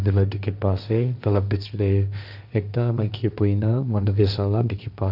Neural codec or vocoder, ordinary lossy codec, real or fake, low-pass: codec, 16 kHz, 1.1 kbps, Voila-Tokenizer; none; fake; 5.4 kHz